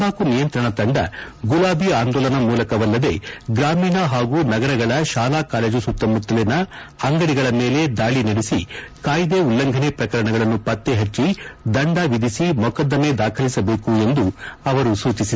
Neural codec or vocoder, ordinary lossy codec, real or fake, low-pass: none; none; real; none